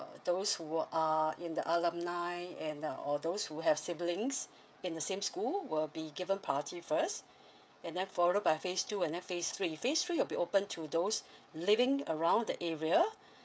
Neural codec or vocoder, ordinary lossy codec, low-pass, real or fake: codec, 16 kHz, 16 kbps, FreqCodec, smaller model; none; none; fake